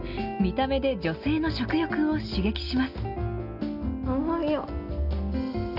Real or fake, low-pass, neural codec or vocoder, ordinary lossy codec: real; 5.4 kHz; none; none